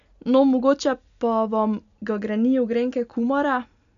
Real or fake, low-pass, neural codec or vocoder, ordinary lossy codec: real; 7.2 kHz; none; none